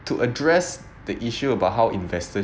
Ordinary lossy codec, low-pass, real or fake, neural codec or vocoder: none; none; real; none